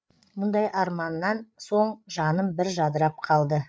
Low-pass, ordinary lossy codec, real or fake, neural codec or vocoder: none; none; fake; codec, 16 kHz, 8 kbps, FreqCodec, larger model